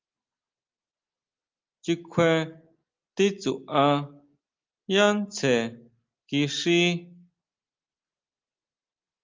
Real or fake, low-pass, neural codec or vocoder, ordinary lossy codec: real; 7.2 kHz; none; Opus, 24 kbps